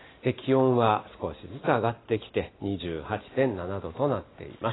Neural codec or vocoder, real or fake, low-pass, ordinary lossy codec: none; real; 7.2 kHz; AAC, 16 kbps